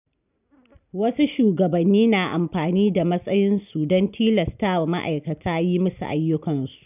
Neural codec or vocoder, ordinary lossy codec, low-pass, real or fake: none; none; 3.6 kHz; real